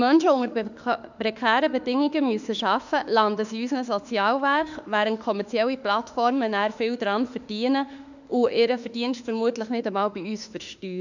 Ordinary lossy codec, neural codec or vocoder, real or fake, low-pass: none; autoencoder, 48 kHz, 32 numbers a frame, DAC-VAE, trained on Japanese speech; fake; 7.2 kHz